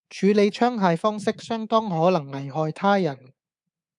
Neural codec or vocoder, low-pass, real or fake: codec, 24 kHz, 3.1 kbps, DualCodec; 10.8 kHz; fake